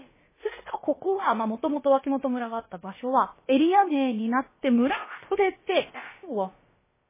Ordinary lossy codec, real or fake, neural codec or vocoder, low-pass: MP3, 16 kbps; fake; codec, 16 kHz, about 1 kbps, DyCAST, with the encoder's durations; 3.6 kHz